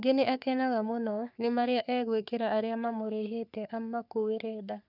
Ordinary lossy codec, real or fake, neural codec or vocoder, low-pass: none; fake; codec, 44.1 kHz, 3.4 kbps, Pupu-Codec; 5.4 kHz